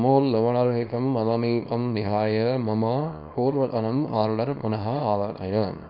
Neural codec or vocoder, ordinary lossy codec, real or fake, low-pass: codec, 24 kHz, 0.9 kbps, WavTokenizer, small release; none; fake; 5.4 kHz